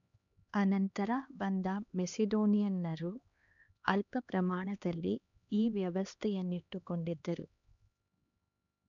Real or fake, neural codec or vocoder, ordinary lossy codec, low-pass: fake; codec, 16 kHz, 2 kbps, X-Codec, HuBERT features, trained on LibriSpeech; none; 7.2 kHz